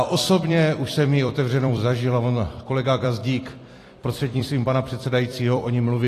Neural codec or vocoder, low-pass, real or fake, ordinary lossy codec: vocoder, 44.1 kHz, 128 mel bands every 256 samples, BigVGAN v2; 14.4 kHz; fake; AAC, 48 kbps